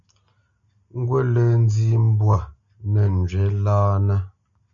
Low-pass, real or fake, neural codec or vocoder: 7.2 kHz; real; none